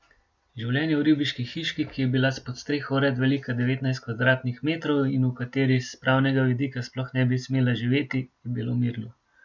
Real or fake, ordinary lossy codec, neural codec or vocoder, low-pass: real; MP3, 64 kbps; none; 7.2 kHz